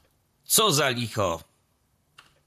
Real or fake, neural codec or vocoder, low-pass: fake; vocoder, 44.1 kHz, 128 mel bands, Pupu-Vocoder; 14.4 kHz